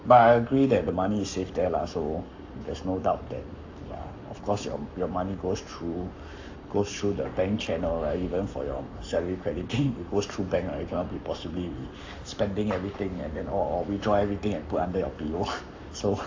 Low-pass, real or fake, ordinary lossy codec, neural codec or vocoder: 7.2 kHz; fake; AAC, 48 kbps; codec, 44.1 kHz, 7.8 kbps, Pupu-Codec